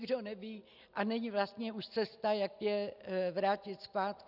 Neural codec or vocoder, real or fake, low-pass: none; real; 5.4 kHz